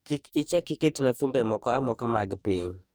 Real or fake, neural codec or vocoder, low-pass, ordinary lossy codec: fake; codec, 44.1 kHz, 2.6 kbps, DAC; none; none